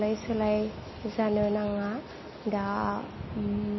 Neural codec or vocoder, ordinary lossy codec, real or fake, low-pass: none; MP3, 24 kbps; real; 7.2 kHz